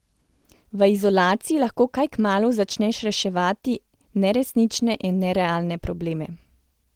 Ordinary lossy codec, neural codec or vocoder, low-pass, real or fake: Opus, 16 kbps; none; 19.8 kHz; real